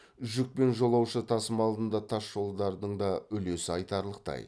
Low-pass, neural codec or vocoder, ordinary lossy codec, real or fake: none; none; none; real